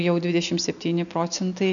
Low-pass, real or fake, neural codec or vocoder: 7.2 kHz; real; none